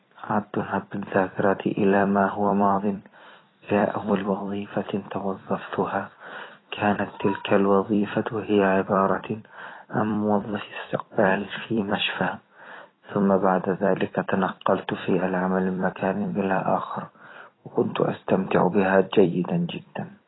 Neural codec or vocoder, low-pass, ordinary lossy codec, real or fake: vocoder, 44.1 kHz, 128 mel bands every 256 samples, BigVGAN v2; 7.2 kHz; AAC, 16 kbps; fake